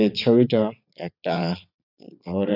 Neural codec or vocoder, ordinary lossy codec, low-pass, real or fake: vocoder, 22.05 kHz, 80 mel bands, Vocos; none; 5.4 kHz; fake